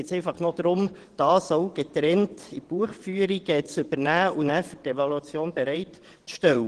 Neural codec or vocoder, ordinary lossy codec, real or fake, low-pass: vocoder, 22.05 kHz, 80 mel bands, WaveNeXt; Opus, 16 kbps; fake; 9.9 kHz